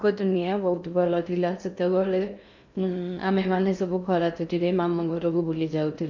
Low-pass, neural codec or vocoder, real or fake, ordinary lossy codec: 7.2 kHz; codec, 16 kHz in and 24 kHz out, 0.6 kbps, FocalCodec, streaming, 2048 codes; fake; none